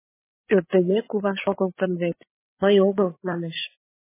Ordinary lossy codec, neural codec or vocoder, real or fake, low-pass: MP3, 16 kbps; codec, 16 kHz, 8 kbps, FreqCodec, larger model; fake; 3.6 kHz